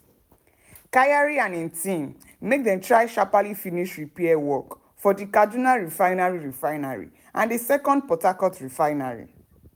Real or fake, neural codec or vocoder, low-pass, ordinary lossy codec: real; none; none; none